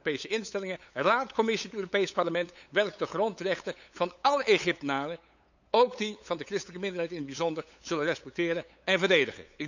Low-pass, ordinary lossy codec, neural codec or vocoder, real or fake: 7.2 kHz; none; codec, 16 kHz, 8 kbps, FunCodec, trained on LibriTTS, 25 frames a second; fake